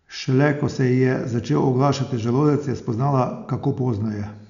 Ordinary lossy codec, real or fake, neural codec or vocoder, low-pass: none; real; none; 7.2 kHz